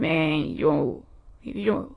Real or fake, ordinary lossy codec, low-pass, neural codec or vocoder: fake; AAC, 32 kbps; 9.9 kHz; autoencoder, 22.05 kHz, a latent of 192 numbers a frame, VITS, trained on many speakers